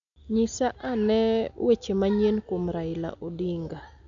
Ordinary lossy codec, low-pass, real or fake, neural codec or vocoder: none; 7.2 kHz; real; none